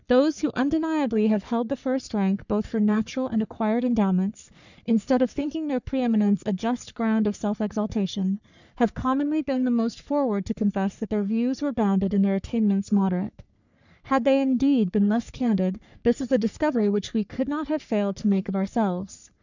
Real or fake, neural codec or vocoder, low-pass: fake; codec, 44.1 kHz, 3.4 kbps, Pupu-Codec; 7.2 kHz